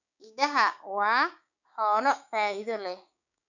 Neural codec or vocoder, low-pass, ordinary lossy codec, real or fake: codec, 16 kHz, 6 kbps, DAC; 7.2 kHz; none; fake